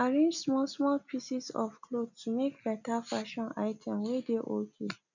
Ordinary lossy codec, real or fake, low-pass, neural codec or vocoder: none; real; 7.2 kHz; none